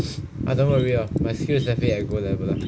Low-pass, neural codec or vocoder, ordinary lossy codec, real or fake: none; none; none; real